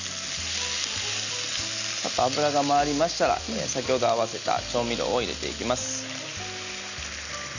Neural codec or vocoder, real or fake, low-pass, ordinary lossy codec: none; real; 7.2 kHz; none